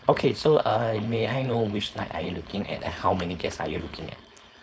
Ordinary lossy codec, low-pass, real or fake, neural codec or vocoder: none; none; fake; codec, 16 kHz, 4.8 kbps, FACodec